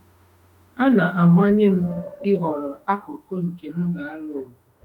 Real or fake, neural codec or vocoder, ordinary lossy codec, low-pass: fake; autoencoder, 48 kHz, 32 numbers a frame, DAC-VAE, trained on Japanese speech; none; 19.8 kHz